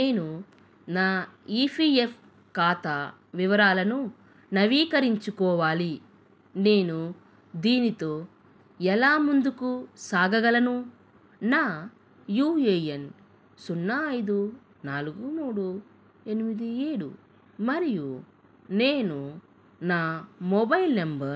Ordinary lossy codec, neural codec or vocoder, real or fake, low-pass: none; none; real; none